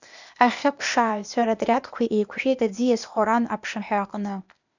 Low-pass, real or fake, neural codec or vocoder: 7.2 kHz; fake; codec, 16 kHz, 0.8 kbps, ZipCodec